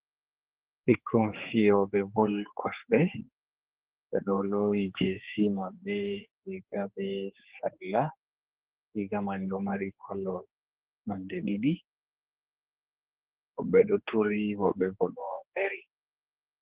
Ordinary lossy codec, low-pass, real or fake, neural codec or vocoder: Opus, 16 kbps; 3.6 kHz; fake; codec, 16 kHz, 4 kbps, X-Codec, HuBERT features, trained on general audio